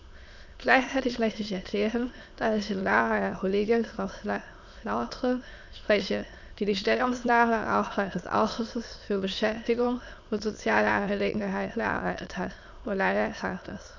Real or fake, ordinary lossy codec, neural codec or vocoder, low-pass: fake; none; autoencoder, 22.05 kHz, a latent of 192 numbers a frame, VITS, trained on many speakers; 7.2 kHz